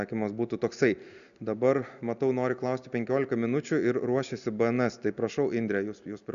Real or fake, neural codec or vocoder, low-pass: real; none; 7.2 kHz